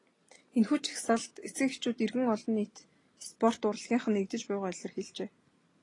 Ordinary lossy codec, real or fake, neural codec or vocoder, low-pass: AAC, 32 kbps; real; none; 10.8 kHz